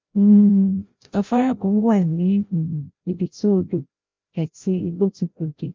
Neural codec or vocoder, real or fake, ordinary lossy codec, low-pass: codec, 16 kHz, 0.5 kbps, FreqCodec, larger model; fake; Opus, 32 kbps; 7.2 kHz